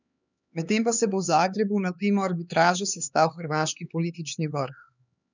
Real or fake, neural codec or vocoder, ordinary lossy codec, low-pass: fake; codec, 16 kHz, 4 kbps, X-Codec, HuBERT features, trained on LibriSpeech; none; 7.2 kHz